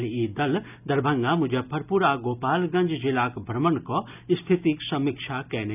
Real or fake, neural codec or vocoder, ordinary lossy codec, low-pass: real; none; none; 3.6 kHz